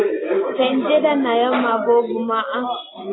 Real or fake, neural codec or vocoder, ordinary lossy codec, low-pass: real; none; AAC, 16 kbps; 7.2 kHz